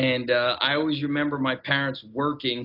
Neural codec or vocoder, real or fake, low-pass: none; real; 5.4 kHz